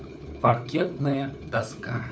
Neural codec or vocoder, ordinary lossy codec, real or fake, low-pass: codec, 16 kHz, 4 kbps, FunCodec, trained on LibriTTS, 50 frames a second; none; fake; none